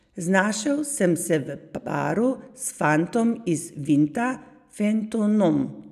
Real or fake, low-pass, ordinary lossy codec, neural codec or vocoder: real; 14.4 kHz; none; none